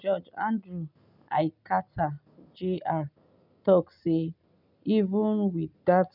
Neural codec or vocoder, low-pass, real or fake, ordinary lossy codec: none; 5.4 kHz; real; none